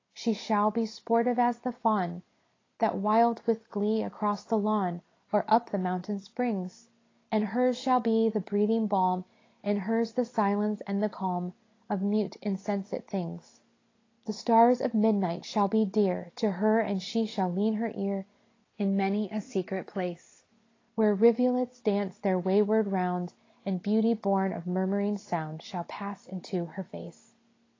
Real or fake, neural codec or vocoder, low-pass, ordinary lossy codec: real; none; 7.2 kHz; AAC, 32 kbps